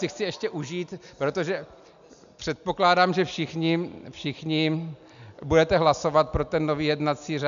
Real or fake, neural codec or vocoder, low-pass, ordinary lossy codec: real; none; 7.2 kHz; AAC, 96 kbps